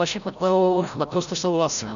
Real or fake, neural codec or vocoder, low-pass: fake; codec, 16 kHz, 0.5 kbps, FreqCodec, larger model; 7.2 kHz